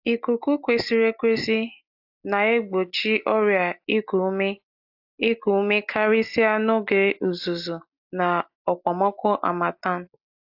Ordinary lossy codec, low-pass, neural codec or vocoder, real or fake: AAC, 48 kbps; 5.4 kHz; none; real